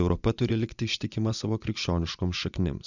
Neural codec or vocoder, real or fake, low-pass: vocoder, 24 kHz, 100 mel bands, Vocos; fake; 7.2 kHz